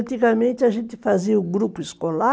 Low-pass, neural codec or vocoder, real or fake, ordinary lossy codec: none; none; real; none